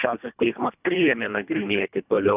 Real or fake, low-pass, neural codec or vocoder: fake; 3.6 kHz; codec, 24 kHz, 1.5 kbps, HILCodec